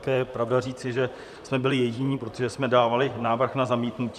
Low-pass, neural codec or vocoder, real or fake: 14.4 kHz; vocoder, 44.1 kHz, 128 mel bands, Pupu-Vocoder; fake